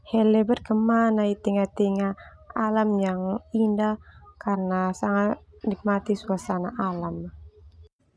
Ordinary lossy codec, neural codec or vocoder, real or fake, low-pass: none; none; real; none